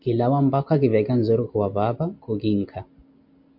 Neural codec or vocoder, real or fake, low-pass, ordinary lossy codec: none; real; 5.4 kHz; MP3, 48 kbps